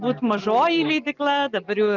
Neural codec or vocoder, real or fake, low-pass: none; real; 7.2 kHz